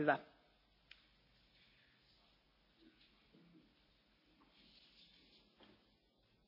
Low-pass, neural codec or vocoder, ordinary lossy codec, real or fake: 5.4 kHz; none; none; real